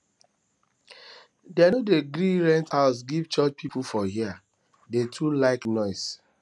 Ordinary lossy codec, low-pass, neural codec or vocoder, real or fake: none; none; none; real